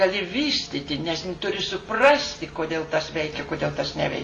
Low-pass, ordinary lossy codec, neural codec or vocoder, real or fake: 10.8 kHz; AAC, 32 kbps; none; real